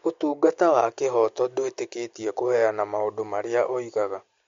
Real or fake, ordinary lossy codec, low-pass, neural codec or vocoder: real; MP3, 48 kbps; 7.2 kHz; none